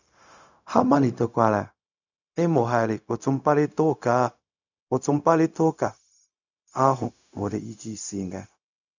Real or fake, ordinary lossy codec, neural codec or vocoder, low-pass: fake; none; codec, 16 kHz, 0.4 kbps, LongCat-Audio-Codec; 7.2 kHz